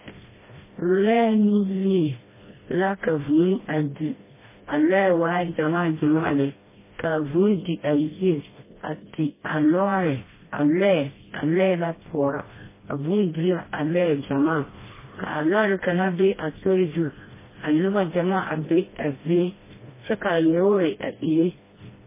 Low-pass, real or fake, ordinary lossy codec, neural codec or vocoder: 3.6 kHz; fake; MP3, 16 kbps; codec, 16 kHz, 1 kbps, FreqCodec, smaller model